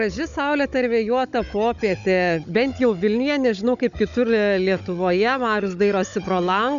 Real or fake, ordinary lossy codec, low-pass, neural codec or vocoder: fake; MP3, 96 kbps; 7.2 kHz; codec, 16 kHz, 16 kbps, FunCodec, trained on Chinese and English, 50 frames a second